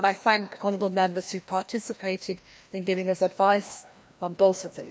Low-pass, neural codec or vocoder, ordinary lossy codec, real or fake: none; codec, 16 kHz, 1 kbps, FreqCodec, larger model; none; fake